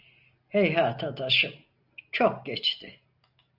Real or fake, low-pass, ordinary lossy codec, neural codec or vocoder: real; 5.4 kHz; Opus, 64 kbps; none